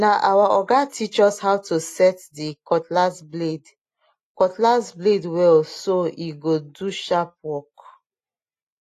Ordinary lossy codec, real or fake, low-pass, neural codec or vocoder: AAC, 48 kbps; real; 14.4 kHz; none